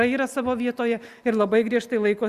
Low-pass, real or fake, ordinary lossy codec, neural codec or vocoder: 14.4 kHz; real; Opus, 64 kbps; none